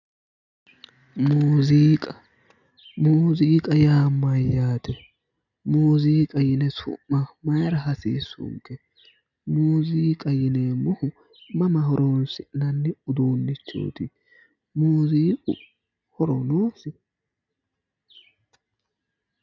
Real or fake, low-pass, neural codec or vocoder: real; 7.2 kHz; none